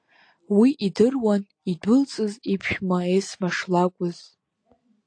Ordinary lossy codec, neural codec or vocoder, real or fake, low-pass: AAC, 48 kbps; none; real; 9.9 kHz